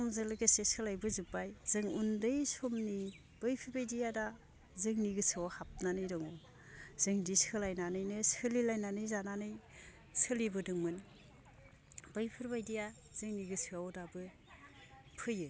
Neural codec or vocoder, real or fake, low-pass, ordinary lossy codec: none; real; none; none